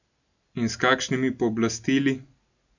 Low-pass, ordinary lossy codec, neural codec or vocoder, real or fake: 7.2 kHz; none; none; real